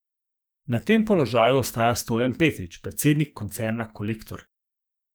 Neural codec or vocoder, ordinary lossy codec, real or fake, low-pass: codec, 44.1 kHz, 2.6 kbps, SNAC; none; fake; none